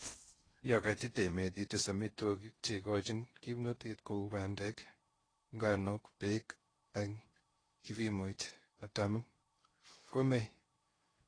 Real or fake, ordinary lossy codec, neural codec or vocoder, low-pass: fake; AAC, 32 kbps; codec, 16 kHz in and 24 kHz out, 0.6 kbps, FocalCodec, streaming, 4096 codes; 9.9 kHz